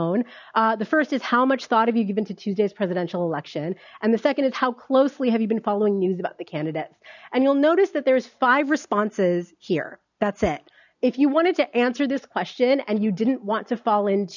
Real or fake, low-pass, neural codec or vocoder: real; 7.2 kHz; none